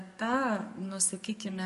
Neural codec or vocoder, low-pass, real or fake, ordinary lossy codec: codec, 44.1 kHz, 7.8 kbps, DAC; 14.4 kHz; fake; MP3, 48 kbps